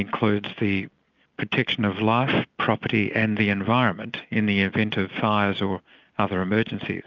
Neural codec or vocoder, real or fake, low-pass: none; real; 7.2 kHz